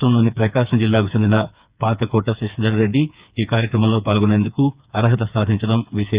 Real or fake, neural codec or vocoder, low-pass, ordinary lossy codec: fake; codec, 16 kHz, 4 kbps, FreqCodec, smaller model; 3.6 kHz; Opus, 64 kbps